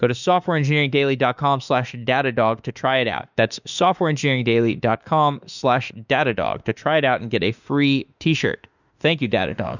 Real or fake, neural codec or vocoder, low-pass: fake; autoencoder, 48 kHz, 32 numbers a frame, DAC-VAE, trained on Japanese speech; 7.2 kHz